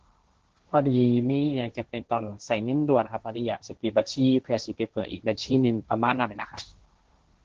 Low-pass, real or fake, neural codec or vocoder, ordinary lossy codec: 7.2 kHz; fake; codec, 16 kHz, 1.1 kbps, Voila-Tokenizer; Opus, 16 kbps